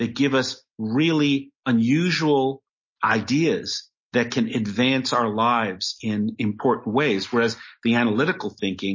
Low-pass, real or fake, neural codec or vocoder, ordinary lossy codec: 7.2 kHz; real; none; MP3, 32 kbps